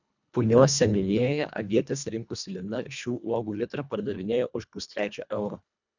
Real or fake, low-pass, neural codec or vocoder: fake; 7.2 kHz; codec, 24 kHz, 1.5 kbps, HILCodec